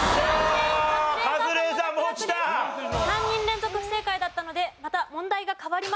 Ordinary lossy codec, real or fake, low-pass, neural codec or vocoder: none; real; none; none